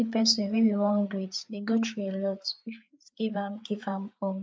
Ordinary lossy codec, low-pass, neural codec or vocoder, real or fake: none; none; codec, 16 kHz, 4 kbps, FreqCodec, larger model; fake